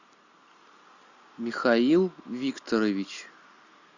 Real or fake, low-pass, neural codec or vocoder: real; 7.2 kHz; none